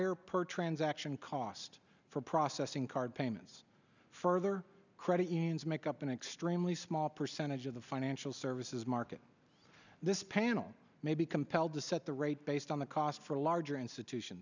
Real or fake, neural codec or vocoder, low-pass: real; none; 7.2 kHz